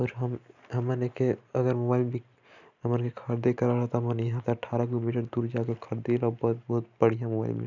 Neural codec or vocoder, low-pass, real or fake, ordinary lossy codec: none; 7.2 kHz; real; none